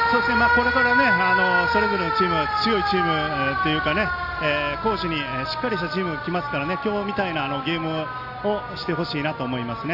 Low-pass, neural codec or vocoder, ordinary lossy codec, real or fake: 5.4 kHz; none; AAC, 32 kbps; real